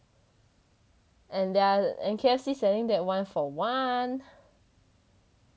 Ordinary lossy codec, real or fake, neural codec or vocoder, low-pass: none; real; none; none